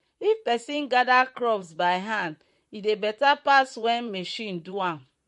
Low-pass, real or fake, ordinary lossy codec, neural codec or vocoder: 14.4 kHz; fake; MP3, 48 kbps; vocoder, 44.1 kHz, 128 mel bands, Pupu-Vocoder